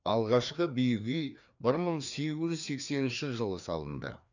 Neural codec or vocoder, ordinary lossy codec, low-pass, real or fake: codec, 16 kHz, 2 kbps, FreqCodec, larger model; none; 7.2 kHz; fake